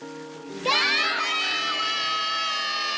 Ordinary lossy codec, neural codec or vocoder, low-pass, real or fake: none; none; none; real